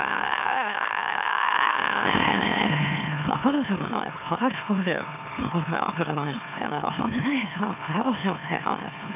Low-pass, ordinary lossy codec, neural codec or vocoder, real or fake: 3.6 kHz; none; autoencoder, 44.1 kHz, a latent of 192 numbers a frame, MeloTTS; fake